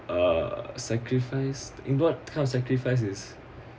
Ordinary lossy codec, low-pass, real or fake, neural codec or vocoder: none; none; real; none